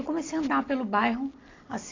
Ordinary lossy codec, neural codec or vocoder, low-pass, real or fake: AAC, 32 kbps; none; 7.2 kHz; real